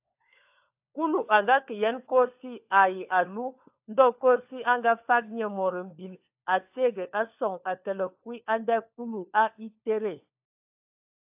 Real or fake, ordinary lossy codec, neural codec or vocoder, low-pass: fake; AAC, 32 kbps; codec, 16 kHz, 4 kbps, FunCodec, trained on LibriTTS, 50 frames a second; 3.6 kHz